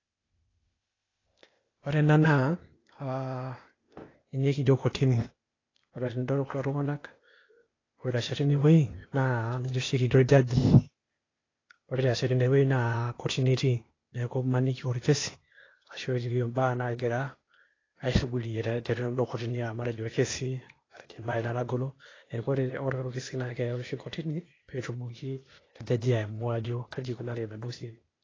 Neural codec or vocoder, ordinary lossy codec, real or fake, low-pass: codec, 16 kHz, 0.8 kbps, ZipCodec; AAC, 32 kbps; fake; 7.2 kHz